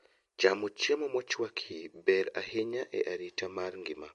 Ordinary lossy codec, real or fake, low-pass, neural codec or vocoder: MP3, 48 kbps; real; 14.4 kHz; none